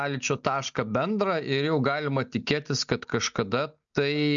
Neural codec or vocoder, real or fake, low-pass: none; real; 7.2 kHz